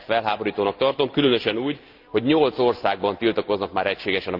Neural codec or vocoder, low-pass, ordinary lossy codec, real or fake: none; 5.4 kHz; Opus, 32 kbps; real